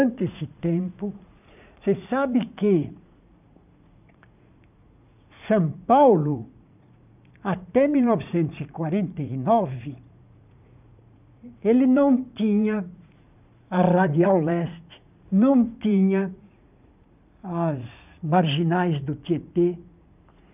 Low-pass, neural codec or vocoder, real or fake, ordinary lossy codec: 3.6 kHz; vocoder, 44.1 kHz, 80 mel bands, Vocos; fake; none